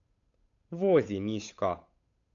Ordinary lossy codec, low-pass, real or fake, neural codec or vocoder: AAC, 64 kbps; 7.2 kHz; fake; codec, 16 kHz, 8 kbps, FunCodec, trained on Chinese and English, 25 frames a second